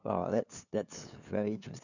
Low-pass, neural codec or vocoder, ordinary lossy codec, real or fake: 7.2 kHz; codec, 16 kHz, 2 kbps, FunCodec, trained on LibriTTS, 25 frames a second; none; fake